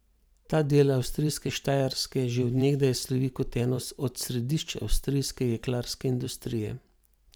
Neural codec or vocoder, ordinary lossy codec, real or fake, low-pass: vocoder, 44.1 kHz, 128 mel bands every 512 samples, BigVGAN v2; none; fake; none